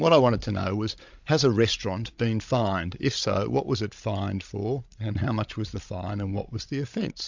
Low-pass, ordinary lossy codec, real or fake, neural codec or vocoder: 7.2 kHz; MP3, 64 kbps; fake; codec, 16 kHz, 16 kbps, FreqCodec, larger model